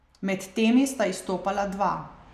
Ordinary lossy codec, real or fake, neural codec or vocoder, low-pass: none; real; none; 14.4 kHz